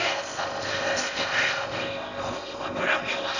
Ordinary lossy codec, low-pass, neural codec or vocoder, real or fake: none; 7.2 kHz; codec, 16 kHz in and 24 kHz out, 0.6 kbps, FocalCodec, streaming, 4096 codes; fake